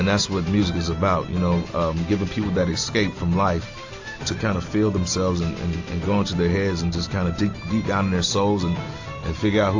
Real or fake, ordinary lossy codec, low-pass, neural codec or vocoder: real; AAC, 48 kbps; 7.2 kHz; none